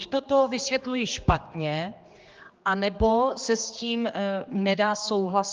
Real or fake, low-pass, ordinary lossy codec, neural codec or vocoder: fake; 7.2 kHz; Opus, 32 kbps; codec, 16 kHz, 2 kbps, X-Codec, HuBERT features, trained on general audio